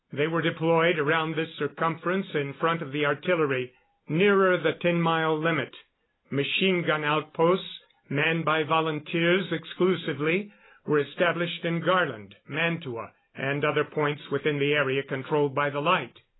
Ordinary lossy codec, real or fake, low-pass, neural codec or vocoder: AAC, 16 kbps; real; 7.2 kHz; none